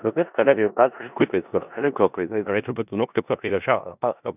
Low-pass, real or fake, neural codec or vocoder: 3.6 kHz; fake; codec, 16 kHz in and 24 kHz out, 0.4 kbps, LongCat-Audio-Codec, four codebook decoder